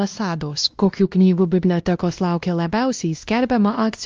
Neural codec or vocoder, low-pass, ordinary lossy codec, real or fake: codec, 16 kHz, 1 kbps, X-Codec, WavLM features, trained on Multilingual LibriSpeech; 7.2 kHz; Opus, 24 kbps; fake